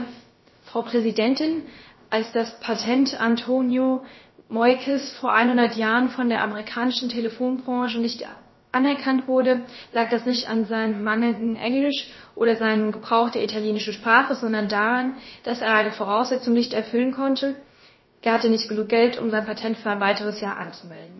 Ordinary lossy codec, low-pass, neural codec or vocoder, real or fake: MP3, 24 kbps; 7.2 kHz; codec, 16 kHz, about 1 kbps, DyCAST, with the encoder's durations; fake